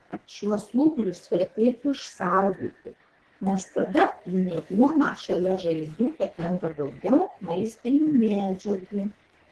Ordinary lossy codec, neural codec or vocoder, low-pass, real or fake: Opus, 16 kbps; codec, 24 kHz, 1.5 kbps, HILCodec; 10.8 kHz; fake